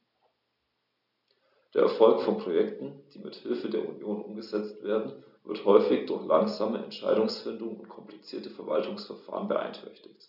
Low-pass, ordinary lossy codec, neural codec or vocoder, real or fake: 5.4 kHz; none; none; real